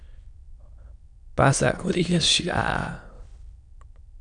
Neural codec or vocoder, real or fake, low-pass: autoencoder, 22.05 kHz, a latent of 192 numbers a frame, VITS, trained on many speakers; fake; 9.9 kHz